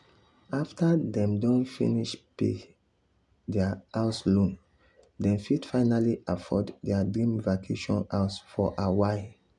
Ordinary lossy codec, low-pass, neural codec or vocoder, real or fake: none; 10.8 kHz; none; real